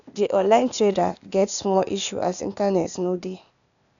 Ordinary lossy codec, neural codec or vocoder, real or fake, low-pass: none; codec, 16 kHz, 0.8 kbps, ZipCodec; fake; 7.2 kHz